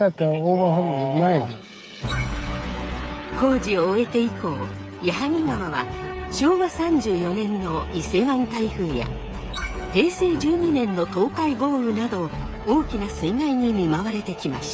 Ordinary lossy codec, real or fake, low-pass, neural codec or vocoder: none; fake; none; codec, 16 kHz, 8 kbps, FreqCodec, smaller model